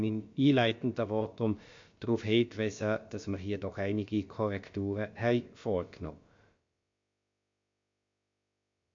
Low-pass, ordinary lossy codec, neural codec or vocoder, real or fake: 7.2 kHz; MP3, 48 kbps; codec, 16 kHz, about 1 kbps, DyCAST, with the encoder's durations; fake